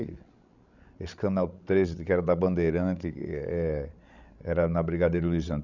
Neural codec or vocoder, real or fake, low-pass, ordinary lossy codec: codec, 16 kHz, 16 kbps, FreqCodec, larger model; fake; 7.2 kHz; none